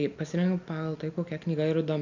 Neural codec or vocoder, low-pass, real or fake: none; 7.2 kHz; real